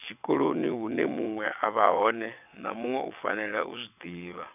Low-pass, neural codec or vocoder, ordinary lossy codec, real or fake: 3.6 kHz; vocoder, 22.05 kHz, 80 mel bands, WaveNeXt; none; fake